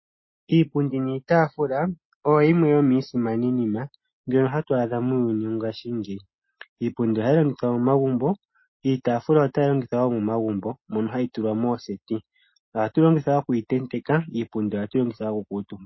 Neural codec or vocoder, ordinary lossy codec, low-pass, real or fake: none; MP3, 24 kbps; 7.2 kHz; real